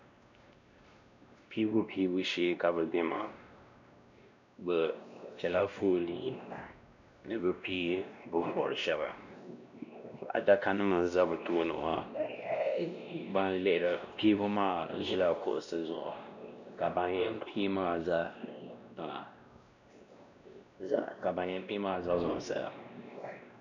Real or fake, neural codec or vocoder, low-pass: fake; codec, 16 kHz, 1 kbps, X-Codec, WavLM features, trained on Multilingual LibriSpeech; 7.2 kHz